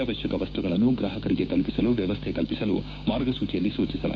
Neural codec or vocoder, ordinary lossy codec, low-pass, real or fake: codec, 16 kHz, 6 kbps, DAC; none; none; fake